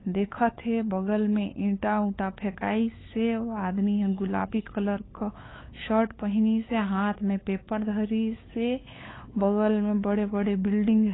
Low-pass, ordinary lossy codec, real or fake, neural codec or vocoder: 7.2 kHz; AAC, 16 kbps; fake; codec, 16 kHz, 8 kbps, FunCodec, trained on LibriTTS, 25 frames a second